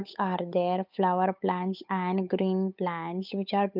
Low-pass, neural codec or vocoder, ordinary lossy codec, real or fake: 5.4 kHz; codec, 16 kHz, 8 kbps, FunCodec, trained on Chinese and English, 25 frames a second; none; fake